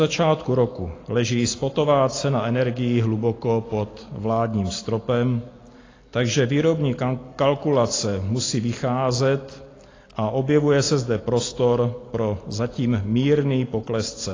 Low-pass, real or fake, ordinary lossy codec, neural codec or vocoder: 7.2 kHz; real; AAC, 32 kbps; none